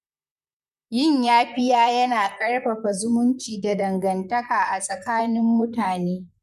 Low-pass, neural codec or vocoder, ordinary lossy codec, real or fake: 14.4 kHz; vocoder, 44.1 kHz, 128 mel bands, Pupu-Vocoder; none; fake